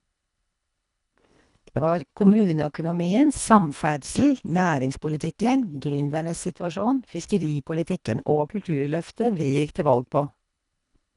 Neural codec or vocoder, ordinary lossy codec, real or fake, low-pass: codec, 24 kHz, 1.5 kbps, HILCodec; none; fake; 10.8 kHz